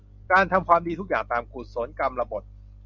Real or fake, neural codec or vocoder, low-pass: real; none; 7.2 kHz